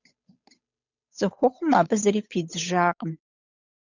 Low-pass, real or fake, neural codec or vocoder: 7.2 kHz; fake; codec, 16 kHz, 8 kbps, FunCodec, trained on Chinese and English, 25 frames a second